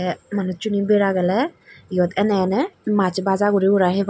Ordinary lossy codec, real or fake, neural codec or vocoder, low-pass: none; real; none; none